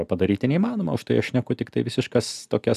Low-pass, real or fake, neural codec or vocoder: 14.4 kHz; real; none